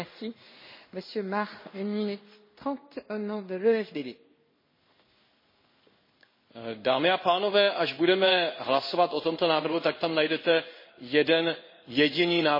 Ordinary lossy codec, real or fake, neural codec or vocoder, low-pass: MP3, 24 kbps; fake; codec, 16 kHz in and 24 kHz out, 1 kbps, XY-Tokenizer; 5.4 kHz